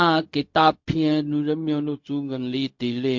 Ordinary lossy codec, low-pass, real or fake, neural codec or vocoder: MP3, 48 kbps; 7.2 kHz; fake; codec, 16 kHz, 0.4 kbps, LongCat-Audio-Codec